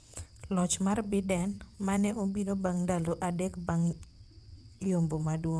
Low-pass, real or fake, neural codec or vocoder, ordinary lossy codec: none; fake; vocoder, 22.05 kHz, 80 mel bands, WaveNeXt; none